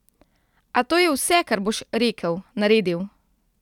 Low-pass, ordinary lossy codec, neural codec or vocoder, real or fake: 19.8 kHz; none; vocoder, 44.1 kHz, 128 mel bands every 256 samples, BigVGAN v2; fake